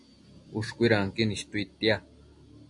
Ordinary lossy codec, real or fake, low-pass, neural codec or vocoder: AAC, 64 kbps; real; 10.8 kHz; none